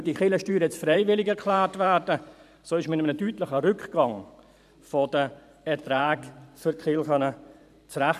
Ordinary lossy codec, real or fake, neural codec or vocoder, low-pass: none; fake; vocoder, 44.1 kHz, 128 mel bands every 512 samples, BigVGAN v2; 14.4 kHz